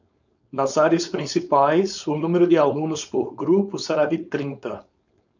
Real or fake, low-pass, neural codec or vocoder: fake; 7.2 kHz; codec, 16 kHz, 4.8 kbps, FACodec